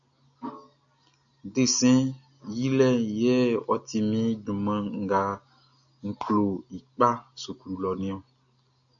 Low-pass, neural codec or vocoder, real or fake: 7.2 kHz; none; real